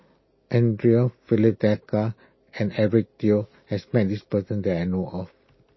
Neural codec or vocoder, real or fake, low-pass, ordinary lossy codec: none; real; 7.2 kHz; MP3, 24 kbps